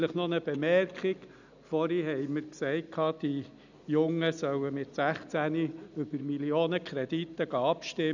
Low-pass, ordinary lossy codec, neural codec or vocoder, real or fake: 7.2 kHz; none; none; real